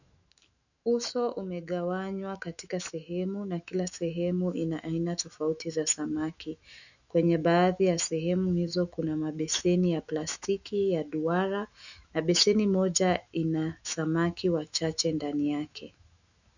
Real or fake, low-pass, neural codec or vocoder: fake; 7.2 kHz; autoencoder, 48 kHz, 128 numbers a frame, DAC-VAE, trained on Japanese speech